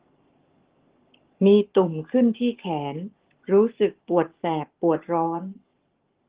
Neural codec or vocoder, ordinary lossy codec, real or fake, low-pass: codec, 44.1 kHz, 7.8 kbps, Pupu-Codec; Opus, 16 kbps; fake; 3.6 kHz